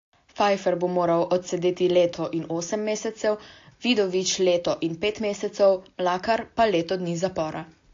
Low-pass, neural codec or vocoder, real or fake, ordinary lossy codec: 7.2 kHz; none; real; AAC, 48 kbps